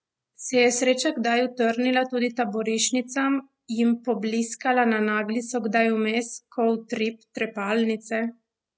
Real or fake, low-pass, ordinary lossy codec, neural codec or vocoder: real; none; none; none